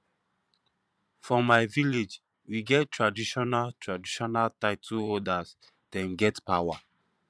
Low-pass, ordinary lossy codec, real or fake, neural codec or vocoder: none; none; fake; vocoder, 22.05 kHz, 80 mel bands, Vocos